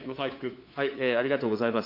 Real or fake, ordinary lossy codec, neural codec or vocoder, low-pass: fake; AAC, 32 kbps; codec, 16 kHz, 8 kbps, FunCodec, trained on LibriTTS, 25 frames a second; 5.4 kHz